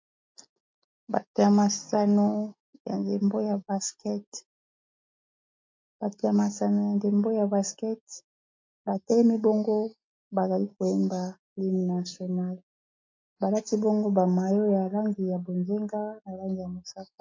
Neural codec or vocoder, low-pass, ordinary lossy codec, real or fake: none; 7.2 kHz; MP3, 48 kbps; real